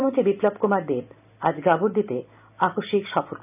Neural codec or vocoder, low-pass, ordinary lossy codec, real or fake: none; 3.6 kHz; none; real